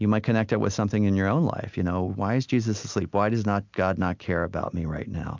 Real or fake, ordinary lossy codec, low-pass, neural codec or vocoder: real; MP3, 64 kbps; 7.2 kHz; none